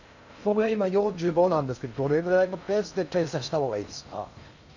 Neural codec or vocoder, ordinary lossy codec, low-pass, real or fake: codec, 16 kHz in and 24 kHz out, 0.6 kbps, FocalCodec, streaming, 2048 codes; none; 7.2 kHz; fake